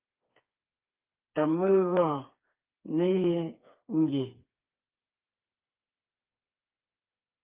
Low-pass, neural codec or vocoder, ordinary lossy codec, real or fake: 3.6 kHz; codec, 16 kHz, 4 kbps, FreqCodec, smaller model; Opus, 24 kbps; fake